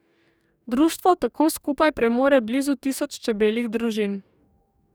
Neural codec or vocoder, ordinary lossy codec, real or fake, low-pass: codec, 44.1 kHz, 2.6 kbps, DAC; none; fake; none